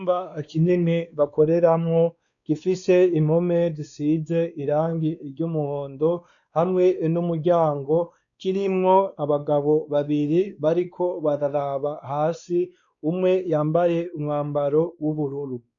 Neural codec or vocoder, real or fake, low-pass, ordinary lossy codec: codec, 16 kHz, 2 kbps, X-Codec, WavLM features, trained on Multilingual LibriSpeech; fake; 7.2 kHz; MP3, 96 kbps